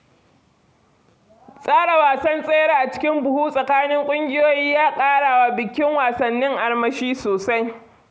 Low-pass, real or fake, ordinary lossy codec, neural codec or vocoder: none; real; none; none